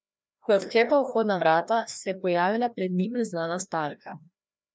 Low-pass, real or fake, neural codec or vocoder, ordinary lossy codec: none; fake; codec, 16 kHz, 1 kbps, FreqCodec, larger model; none